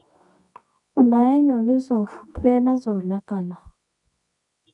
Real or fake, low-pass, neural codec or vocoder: fake; 10.8 kHz; codec, 24 kHz, 0.9 kbps, WavTokenizer, medium music audio release